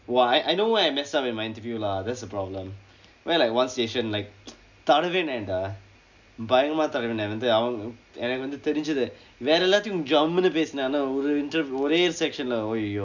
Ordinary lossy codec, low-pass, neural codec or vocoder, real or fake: none; 7.2 kHz; none; real